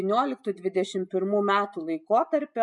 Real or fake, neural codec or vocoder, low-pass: real; none; 10.8 kHz